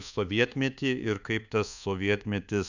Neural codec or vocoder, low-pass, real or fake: codec, 24 kHz, 1.2 kbps, DualCodec; 7.2 kHz; fake